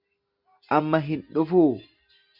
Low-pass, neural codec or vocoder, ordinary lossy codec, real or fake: 5.4 kHz; none; Opus, 64 kbps; real